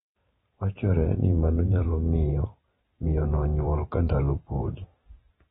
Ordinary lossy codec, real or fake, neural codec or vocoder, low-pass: AAC, 16 kbps; fake; vocoder, 44.1 kHz, 128 mel bands every 512 samples, BigVGAN v2; 19.8 kHz